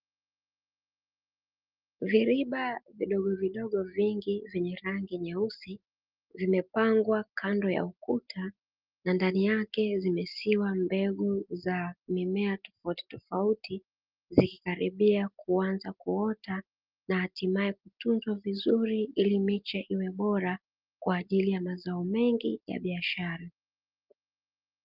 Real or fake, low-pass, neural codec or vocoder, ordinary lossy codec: real; 5.4 kHz; none; Opus, 32 kbps